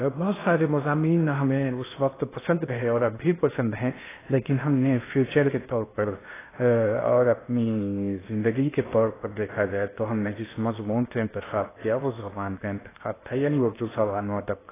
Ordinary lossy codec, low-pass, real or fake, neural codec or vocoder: AAC, 16 kbps; 3.6 kHz; fake; codec, 16 kHz in and 24 kHz out, 0.6 kbps, FocalCodec, streaming, 2048 codes